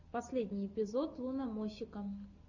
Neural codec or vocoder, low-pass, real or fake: none; 7.2 kHz; real